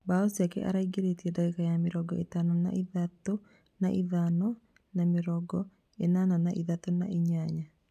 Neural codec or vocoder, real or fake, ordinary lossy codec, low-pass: none; real; none; 14.4 kHz